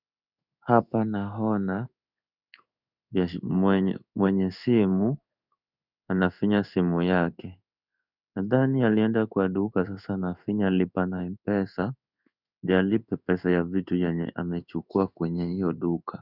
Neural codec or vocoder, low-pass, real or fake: codec, 16 kHz in and 24 kHz out, 1 kbps, XY-Tokenizer; 5.4 kHz; fake